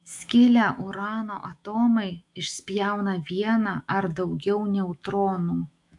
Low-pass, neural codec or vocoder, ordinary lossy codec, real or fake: 10.8 kHz; autoencoder, 48 kHz, 128 numbers a frame, DAC-VAE, trained on Japanese speech; AAC, 64 kbps; fake